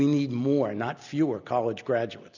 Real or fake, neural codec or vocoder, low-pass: real; none; 7.2 kHz